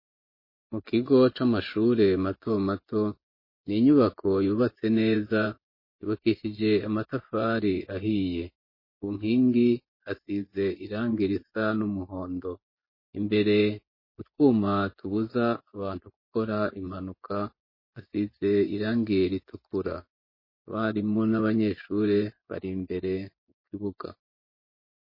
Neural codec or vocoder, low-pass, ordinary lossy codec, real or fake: vocoder, 24 kHz, 100 mel bands, Vocos; 5.4 kHz; MP3, 24 kbps; fake